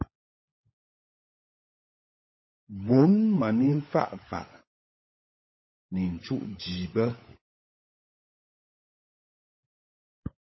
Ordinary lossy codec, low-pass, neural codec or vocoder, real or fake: MP3, 24 kbps; 7.2 kHz; codec, 16 kHz, 16 kbps, FunCodec, trained on LibriTTS, 50 frames a second; fake